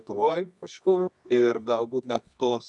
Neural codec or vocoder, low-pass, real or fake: codec, 24 kHz, 0.9 kbps, WavTokenizer, medium music audio release; 10.8 kHz; fake